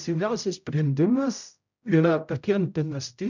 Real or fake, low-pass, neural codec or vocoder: fake; 7.2 kHz; codec, 16 kHz, 0.5 kbps, X-Codec, HuBERT features, trained on general audio